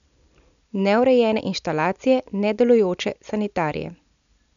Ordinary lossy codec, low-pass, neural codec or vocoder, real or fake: none; 7.2 kHz; none; real